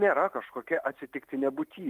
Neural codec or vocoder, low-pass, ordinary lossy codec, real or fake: vocoder, 44.1 kHz, 128 mel bands every 512 samples, BigVGAN v2; 14.4 kHz; Opus, 32 kbps; fake